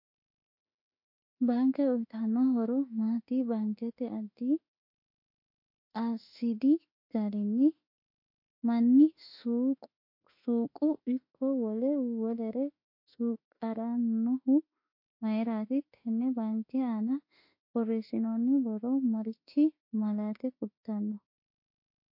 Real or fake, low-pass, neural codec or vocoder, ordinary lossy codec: fake; 5.4 kHz; autoencoder, 48 kHz, 32 numbers a frame, DAC-VAE, trained on Japanese speech; MP3, 32 kbps